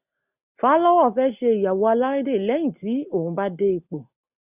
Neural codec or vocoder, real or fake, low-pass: none; real; 3.6 kHz